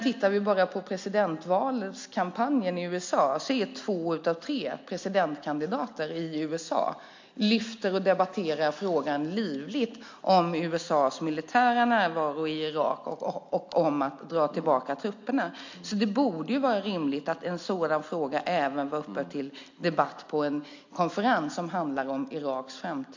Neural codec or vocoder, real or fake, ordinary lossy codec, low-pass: none; real; MP3, 48 kbps; 7.2 kHz